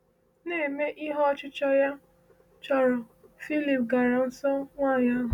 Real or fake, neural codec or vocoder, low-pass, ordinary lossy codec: real; none; 19.8 kHz; none